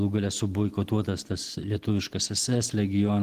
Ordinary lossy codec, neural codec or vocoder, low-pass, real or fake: Opus, 16 kbps; none; 14.4 kHz; real